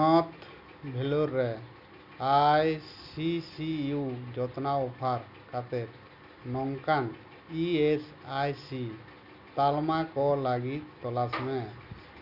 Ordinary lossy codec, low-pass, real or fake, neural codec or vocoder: none; 5.4 kHz; real; none